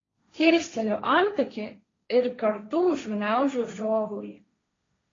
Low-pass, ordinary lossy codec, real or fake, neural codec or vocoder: 7.2 kHz; AAC, 32 kbps; fake; codec, 16 kHz, 1.1 kbps, Voila-Tokenizer